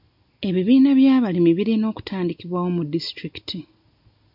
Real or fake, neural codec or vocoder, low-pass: real; none; 5.4 kHz